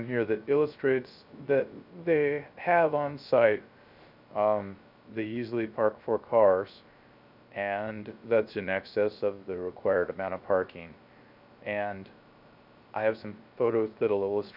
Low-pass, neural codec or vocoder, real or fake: 5.4 kHz; codec, 16 kHz, 0.3 kbps, FocalCodec; fake